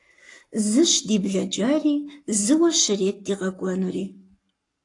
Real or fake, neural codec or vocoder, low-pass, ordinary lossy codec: fake; codec, 44.1 kHz, 7.8 kbps, Pupu-Codec; 10.8 kHz; AAC, 48 kbps